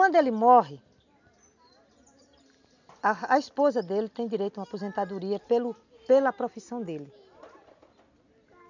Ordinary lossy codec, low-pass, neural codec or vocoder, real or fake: none; 7.2 kHz; none; real